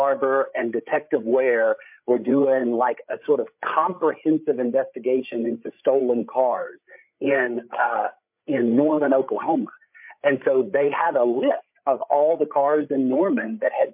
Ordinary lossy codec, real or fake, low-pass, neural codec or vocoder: MP3, 32 kbps; fake; 3.6 kHz; codec, 16 kHz, 8 kbps, FreqCodec, larger model